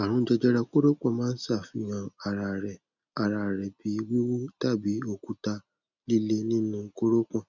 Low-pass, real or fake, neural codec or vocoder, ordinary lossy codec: 7.2 kHz; real; none; none